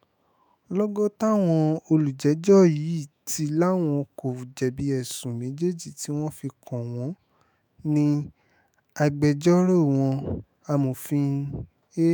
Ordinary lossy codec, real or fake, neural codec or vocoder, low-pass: none; fake; autoencoder, 48 kHz, 128 numbers a frame, DAC-VAE, trained on Japanese speech; none